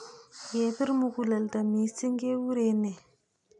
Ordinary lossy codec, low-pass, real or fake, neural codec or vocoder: none; 10.8 kHz; real; none